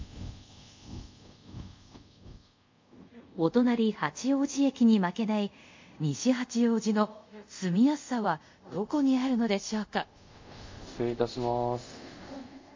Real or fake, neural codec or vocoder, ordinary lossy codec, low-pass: fake; codec, 24 kHz, 0.5 kbps, DualCodec; MP3, 48 kbps; 7.2 kHz